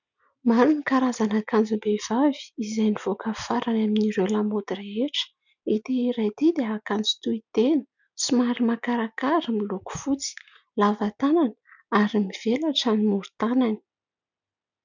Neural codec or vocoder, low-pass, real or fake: none; 7.2 kHz; real